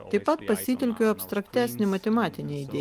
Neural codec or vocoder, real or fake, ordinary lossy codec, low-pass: none; real; Opus, 32 kbps; 14.4 kHz